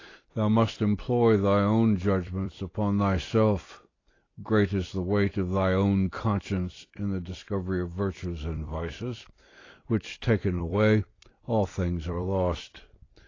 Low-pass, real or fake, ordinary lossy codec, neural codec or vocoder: 7.2 kHz; fake; AAC, 32 kbps; vocoder, 44.1 kHz, 128 mel bands every 256 samples, BigVGAN v2